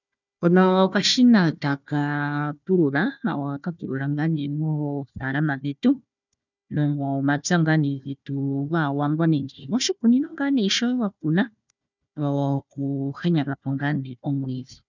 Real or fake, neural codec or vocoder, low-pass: fake; codec, 16 kHz, 1 kbps, FunCodec, trained on Chinese and English, 50 frames a second; 7.2 kHz